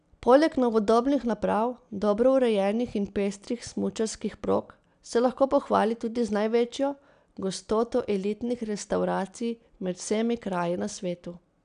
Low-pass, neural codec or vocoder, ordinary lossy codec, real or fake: 9.9 kHz; none; none; real